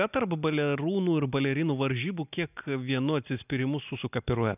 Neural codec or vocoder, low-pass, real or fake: none; 3.6 kHz; real